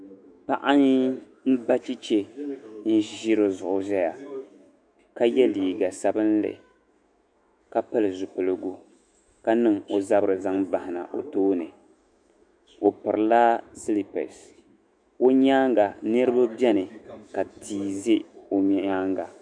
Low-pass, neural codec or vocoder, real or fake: 9.9 kHz; autoencoder, 48 kHz, 128 numbers a frame, DAC-VAE, trained on Japanese speech; fake